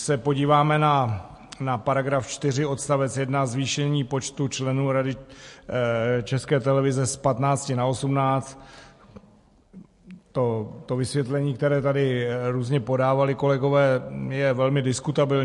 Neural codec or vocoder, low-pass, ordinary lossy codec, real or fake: none; 14.4 kHz; MP3, 48 kbps; real